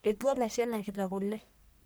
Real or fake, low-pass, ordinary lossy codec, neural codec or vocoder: fake; none; none; codec, 44.1 kHz, 1.7 kbps, Pupu-Codec